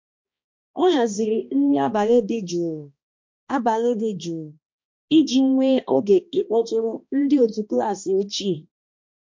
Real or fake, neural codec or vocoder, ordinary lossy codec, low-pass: fake; codec, 16 kHz, 1 kbps, X-Codec, HuBERT features, trained on balanced general audio; MP3, 48 kbps; 7.2 kHz